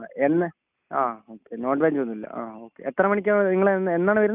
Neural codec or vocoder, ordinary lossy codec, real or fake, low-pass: none; none; real; 3.6 kHz